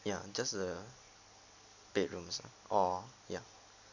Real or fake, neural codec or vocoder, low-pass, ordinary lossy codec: real; none; 7.2 kHz; none